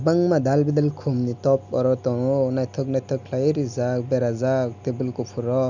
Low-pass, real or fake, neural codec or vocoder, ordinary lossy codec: 7.2 kHz; real; none; none